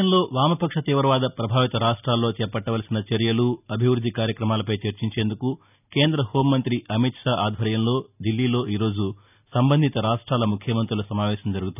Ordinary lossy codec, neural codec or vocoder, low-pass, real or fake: none; none; 3.6 kHz; real